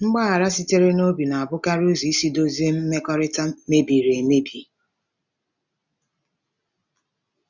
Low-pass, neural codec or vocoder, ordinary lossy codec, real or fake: 7.2 kHz; none; none; real